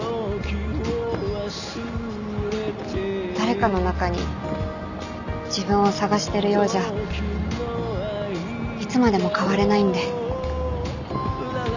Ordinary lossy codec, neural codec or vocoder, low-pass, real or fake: none; none; 7.2 kHz; real